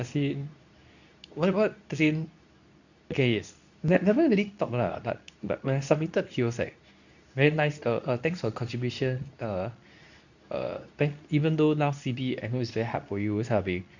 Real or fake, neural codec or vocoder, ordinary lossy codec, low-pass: fake; codec, 24 kHz, 0.9 kbps, WavTokenizer, medium speech release version 2; none; 7.2 kHz